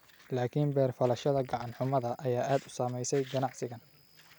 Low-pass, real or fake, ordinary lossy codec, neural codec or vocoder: none; real; none; none